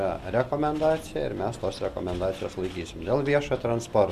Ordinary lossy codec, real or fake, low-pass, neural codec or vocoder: MP3, 96 kbps; real; 14.4 kHz; none